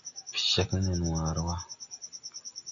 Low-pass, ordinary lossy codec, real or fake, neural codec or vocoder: 7.2 kHz; AAC, 48 kbps; real; none